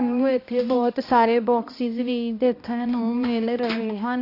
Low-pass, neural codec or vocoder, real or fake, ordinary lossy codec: 5.4 kHz; codec, 16 kHz, 1 kbps, X-Codec, HuBERT features, trained on balanced general audio; fake; AAC, 32 kbps